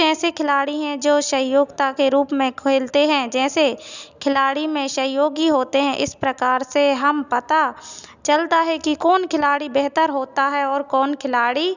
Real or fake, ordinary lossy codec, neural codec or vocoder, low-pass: real; none; none; 7.2 kHz